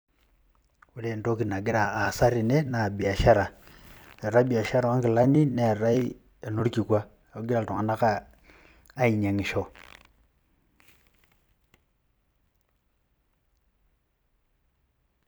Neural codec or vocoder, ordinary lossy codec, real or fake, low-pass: vocoder, 44.1 kHz, 128 mel bands every 256 samples, BigVGAN v2; none; fake; none